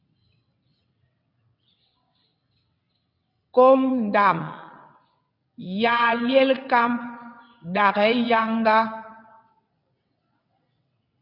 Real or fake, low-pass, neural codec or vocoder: fake; 5.4 kHz; vocoder, 22.05 kHz, 80 mel bands, WaveNeXt